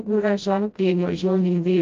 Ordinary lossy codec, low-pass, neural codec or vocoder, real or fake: Opus, 24 kbps; 7.2 kHz; codec, 16 kHz, 0.5 kbps, FreqCodec, smaller model; fake